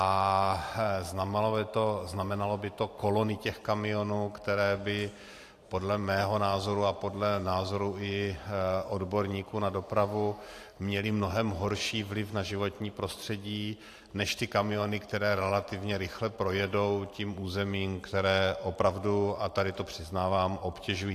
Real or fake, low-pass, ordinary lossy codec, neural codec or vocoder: fake; 14.4 kHz; AAC, 64 kbps; vocoder, 44.1 kHz, 128 mel bands every 512 samples, BigVGAN v2